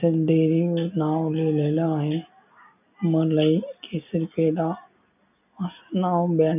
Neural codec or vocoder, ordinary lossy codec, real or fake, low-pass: none; none; real; 3.6 kHz